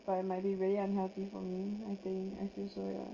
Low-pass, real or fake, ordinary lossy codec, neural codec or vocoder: 7.2 kHz; real; Opus, 24 kbps; none